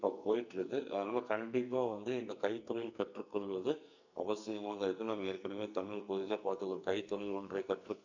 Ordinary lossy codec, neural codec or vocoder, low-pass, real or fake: none; codec, 44.1 kHz, 2.6 kbps, SNAC; 7.2 kHz; fake